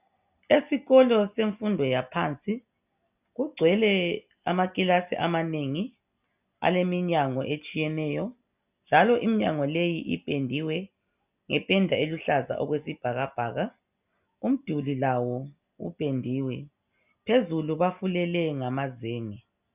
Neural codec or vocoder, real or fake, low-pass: none; real; 3.6 kHz